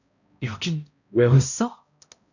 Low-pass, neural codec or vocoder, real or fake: 7.2 kHz; codec, 16 kHz, 0.5 kbps, X-Codec, HuBERT features, trained on balanced general audio; fake